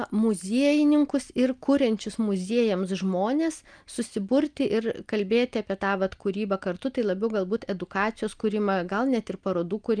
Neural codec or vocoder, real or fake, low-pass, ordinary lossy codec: none; real; 9.9 kHz; Opus, 32 kbps